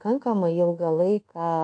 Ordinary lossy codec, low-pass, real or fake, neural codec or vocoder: AAC, 48 kbps; 9.9 kHz; fake; codec, 24 kHz, 1.2 kbps, DualCodec